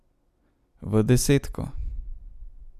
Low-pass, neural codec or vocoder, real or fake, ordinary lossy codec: 14.4 kHz; none; real; none